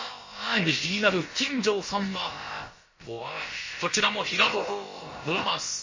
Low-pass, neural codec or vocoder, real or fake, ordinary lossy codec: 7.2 kHz; codec, 16 kHz, about 1 kbps, DyCAST, with the encoder's durations; fake; MP3, 32 kbps